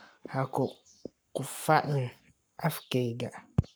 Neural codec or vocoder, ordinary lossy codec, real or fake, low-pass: codec, 44.1 kHz, 7.8 kbps, Pupu-Codec; none; fake; none